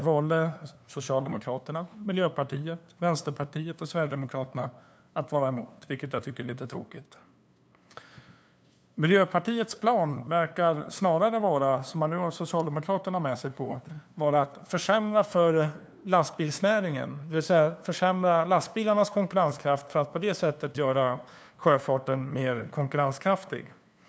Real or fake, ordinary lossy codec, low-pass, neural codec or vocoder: fake; none; none; codec, 16 kHz, 2 kbps, FunCodec, trained on LibriTTS, 25 frames a second